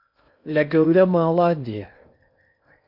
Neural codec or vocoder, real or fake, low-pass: codec, 16 kHz in and 24 kHz out, 0.6 kbps, FocalCodec, streaming, 2048 codes; fake; 5.4 kHz